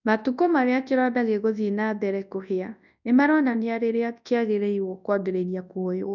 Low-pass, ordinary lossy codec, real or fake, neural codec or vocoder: 7.2 kHz; none; fake; codec, 24 kHz, 0.9 kbps, WavTokenizer, large speech release